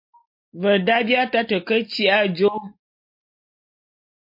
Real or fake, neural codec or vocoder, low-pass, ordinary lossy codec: real; none; 5.4 kHz; MP3, 32 kbps